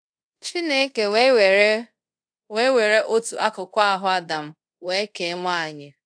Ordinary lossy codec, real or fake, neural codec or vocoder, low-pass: AAC, 64 kbps; fake; codec, 24 kHz, 0.5 kbps, DualCodec; 9.9 kHz